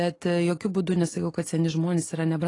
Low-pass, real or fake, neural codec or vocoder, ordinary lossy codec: 10.8 kHz; real; none; AAC, 32 kbps